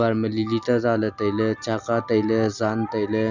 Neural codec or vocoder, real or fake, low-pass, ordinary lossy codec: none; real; 7.2 kHz; none